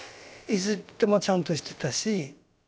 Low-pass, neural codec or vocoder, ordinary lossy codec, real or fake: none; codec, 16 kHz, about 1 kbps, DyCAST, with the encoder's durations; none; fake